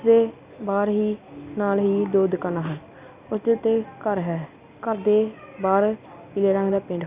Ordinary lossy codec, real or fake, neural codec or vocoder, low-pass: Opus, 64 kbps; real; none; 3.6 kHz